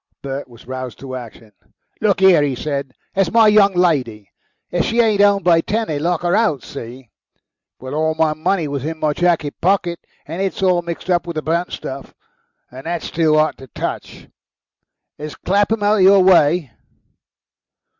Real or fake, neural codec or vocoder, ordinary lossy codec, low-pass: real; none; Opus, 64 kbps; 7.2 kHz